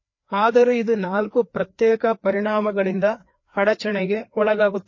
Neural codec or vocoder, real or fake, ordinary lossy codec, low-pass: codec, 16 kHz, 2 kbps, FreqCodec, larger model; fake; MP3, 32 kbps; 7.2 kHz